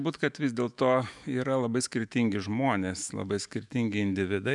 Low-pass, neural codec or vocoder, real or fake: 10.8 kHz; autoencoder, 48 kHz, 128 numbers a frame, DAC-VAE, trained on Japanese speech; fake